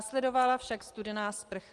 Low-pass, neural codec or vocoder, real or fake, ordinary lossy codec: 10.8 kHz; none; real; Opus, 24 kbps